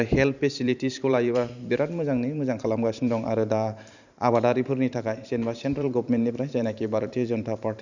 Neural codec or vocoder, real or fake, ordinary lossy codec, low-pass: vocoder, 44.1 kHz, 128 mel bands every 512 samples, BigVGAN v2; fake; none; 7.2 kHz